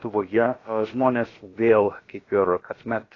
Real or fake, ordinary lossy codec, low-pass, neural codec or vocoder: fake; AAC, 32 kbps; 7.2 kHz; codec, 16 kHz, about 1 kbps, DyCAST, with the encoder's durations